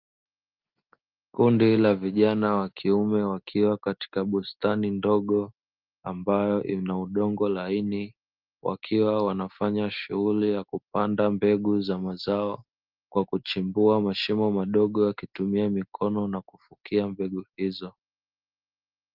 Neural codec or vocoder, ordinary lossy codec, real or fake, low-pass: none; Opus, 32 kbps; real; 5.4 kHz